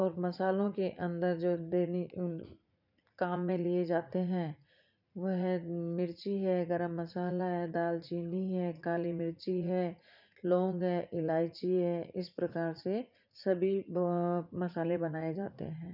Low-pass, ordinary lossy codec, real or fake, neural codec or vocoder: 5.4 kHz; none; fake; vocoder, 44.1 kHz, 80 mel bands, Vocos